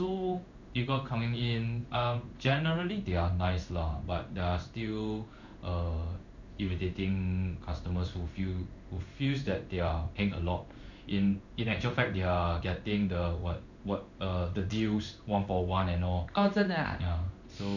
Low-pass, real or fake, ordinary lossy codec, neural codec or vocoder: 7.2 kHz; fake; none; codec, 16 kHz in and 24 kHz out, 1 kbps, XY-Tokenizer